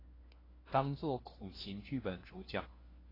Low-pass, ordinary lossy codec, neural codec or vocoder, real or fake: 5.4 kHz; AAC, 24 kbps; codec, 16 kHz, 1 kbps, FunCodec, trained on LibriTTS, 50 frames a second; fake